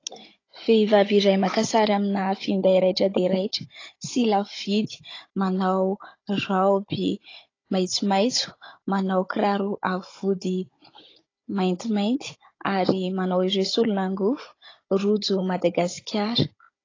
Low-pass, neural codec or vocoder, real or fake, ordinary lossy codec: 7.2 kHz; codec, 16 kHz, 16 kbps, FunCodec, trained on Chinese and English, 50 frames a second; fake; AAC, 32 kbps